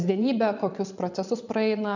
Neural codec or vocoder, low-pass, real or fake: vocoder, 22.05 kHz, 80 mel bands, Vocos; 7.2 kHz; fake